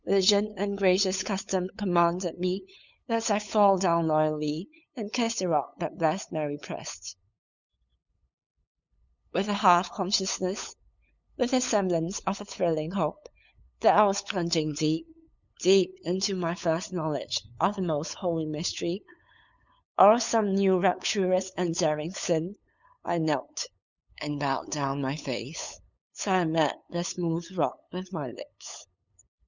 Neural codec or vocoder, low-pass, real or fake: codec, 16 kHz, 8 kbps, FunCodec, trained on LibriTTS, 25 frames a second; 7.2 kHz; fake